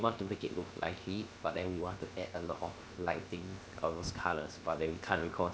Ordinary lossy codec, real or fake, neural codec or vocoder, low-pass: none; fake; codec, 16 kHz, 0.7 kbps, FocalCodec; none